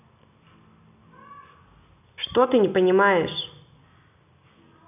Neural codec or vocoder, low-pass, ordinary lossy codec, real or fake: none; 3.6 kHz; none; real